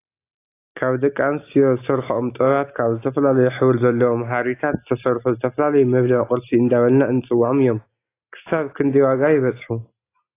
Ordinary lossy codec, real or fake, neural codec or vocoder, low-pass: AAC, 24 kbps; real; none; 3.6 kHz